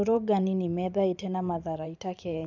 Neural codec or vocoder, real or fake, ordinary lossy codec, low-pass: vocoder, 44.1 kHz, 128 mel bands every 512 samples, BigVGAN v2; fake; none; 7.2 kHz